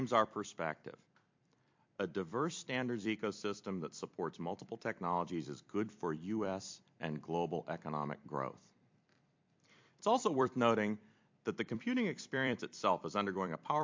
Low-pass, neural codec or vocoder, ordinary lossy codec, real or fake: 7.2 kHz; vocoder, 44.1 kHz, 128 mel bands every 256 samples, BigVGAN v2; MP3, 48 kbps; fake